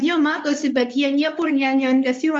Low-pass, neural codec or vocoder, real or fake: 10.8 kHz; codec, 24 kHz, 0.9 kbps, WavTokenizer, medium speech release version 2; fake